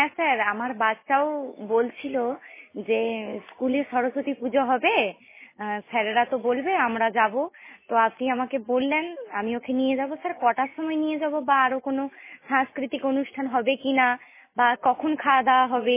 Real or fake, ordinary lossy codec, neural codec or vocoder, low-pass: real; MP3, 16 kbps; none; 3.6 kHz